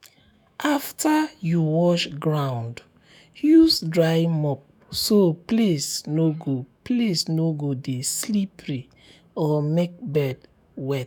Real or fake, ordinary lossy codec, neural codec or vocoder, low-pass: fake; none; autoencoder, 48 kHz, 128 numbers a frame, DAC-VAE, trained on Japanese speech; none